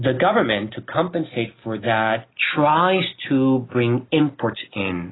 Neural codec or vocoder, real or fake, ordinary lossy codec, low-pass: none; real; AAC, 16 kbps; 7.2 kHz